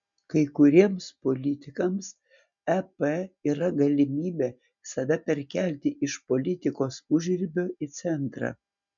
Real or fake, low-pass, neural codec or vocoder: real; 7.2 kHz; none